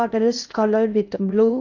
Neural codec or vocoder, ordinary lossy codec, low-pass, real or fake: codec, 16 kHz in and 24 kHz out, 0.6 kbps, FocalCodec, streaming, 4096 codes; none; 7.2 kHz; fake